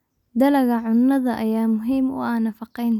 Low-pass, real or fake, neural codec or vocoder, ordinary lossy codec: 19.8 kHz; real; none; none